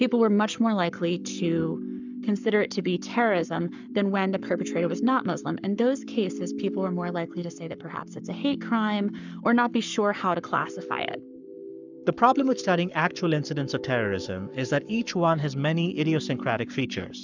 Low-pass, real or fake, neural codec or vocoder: 7.2 kHz; fake; codec, 44.1 kHz, 7.8 kbps, Pupu-Codec